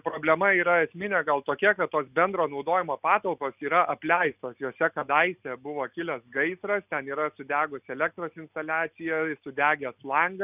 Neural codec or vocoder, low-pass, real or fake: none; 3.6 kHz; real